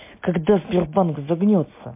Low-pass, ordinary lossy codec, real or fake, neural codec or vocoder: 3.6 kHz; MP3, 24 kbps; real; none